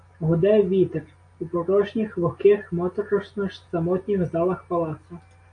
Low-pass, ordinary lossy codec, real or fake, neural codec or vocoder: 9.9 kHz; AAC, 48 kbps; real; none